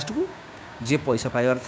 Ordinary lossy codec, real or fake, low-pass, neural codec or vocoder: none; fake; none; codec, 16 kHz, 6 kbps, DAC